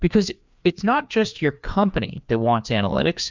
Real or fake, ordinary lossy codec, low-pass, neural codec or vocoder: fake; MP3, 64 kbps; 7.2 kHz; codec, 16 kHz, 2 kbps, FreqCodec, larger model